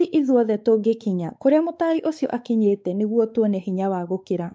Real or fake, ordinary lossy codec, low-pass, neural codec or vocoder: fake; none; none; codec, 16 kHz, 4 kbps, X-Codec, WavLM features, trained on Multilingual LibriSpeech